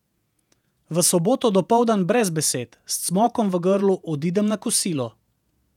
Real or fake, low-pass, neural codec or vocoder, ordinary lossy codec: fake; 19.8 kHz; vocoder, 48 kHz, 128 mel bands, Vocos; none